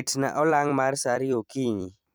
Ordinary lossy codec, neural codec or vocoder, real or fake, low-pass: none; vocoder, 44.1 kHz, 128 mel bands every 256 samples, BigVGAN v2; fake; none